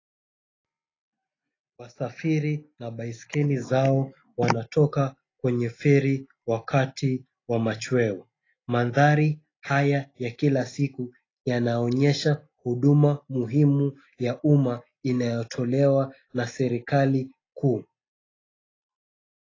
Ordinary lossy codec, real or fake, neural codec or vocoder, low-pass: AAC, 32 kbps; real; none; 7.2 kHz